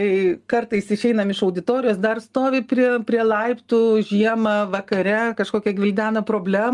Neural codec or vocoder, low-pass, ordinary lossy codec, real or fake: none; 10.8 kHz; Opus, 32 kbps; real